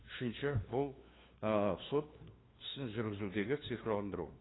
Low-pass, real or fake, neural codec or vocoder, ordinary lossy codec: 7.2 kHz; fake; codec, 16 kHz, 2 kbps, FunCodec, trained on LibriTTS, 25 frames a second; AAC, 16 kbps